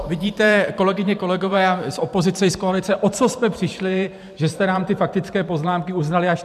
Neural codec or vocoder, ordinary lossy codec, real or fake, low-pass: vocoder, 44.1 kHz, 128 mel bands every 512 samples, BigVGAN v2; MP3, 96 kbps; fake; 14.4 kHz